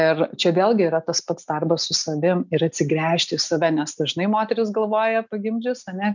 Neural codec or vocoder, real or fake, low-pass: none; real; 7.2 kHz